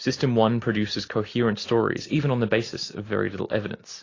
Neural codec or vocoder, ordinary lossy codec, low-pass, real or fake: none; AAC, 32 kbps; 7.2 kHz; real